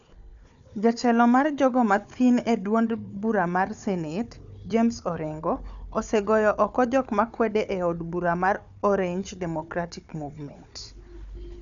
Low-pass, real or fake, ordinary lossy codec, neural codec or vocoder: 7.2 kHz; fake; none; codec, 16 kHz, 4 kbps, FunCodec, trained on Chinese and English, 50 frames a second